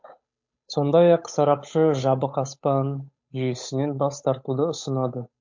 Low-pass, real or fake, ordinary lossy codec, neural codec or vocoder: 7.2 kHz; fake; MP3, 48 kbps; codec, 16 kHz, 8 kbps, FunCodec, trained on Chinese and English, 25 frames a second